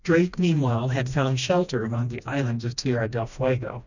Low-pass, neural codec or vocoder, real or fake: 7.2 kHz; codec, 16 kHz, 1 kbps, FreqCodec, smaller model; fake